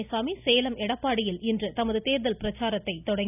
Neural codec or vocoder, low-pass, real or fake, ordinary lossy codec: none; 3.6 kHz; real; none